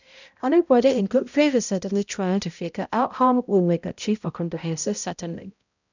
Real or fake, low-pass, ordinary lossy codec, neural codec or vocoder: fake; 7.2 kHz; none; codec, 16 kHz, 0.5 kbps, X-Codec, HuBERT features, trained on balanced general audio